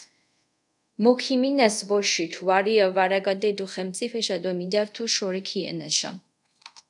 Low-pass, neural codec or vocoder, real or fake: 10.8 kHz; codec, 24 kHz, 0.5 kbps, DualCodec; fake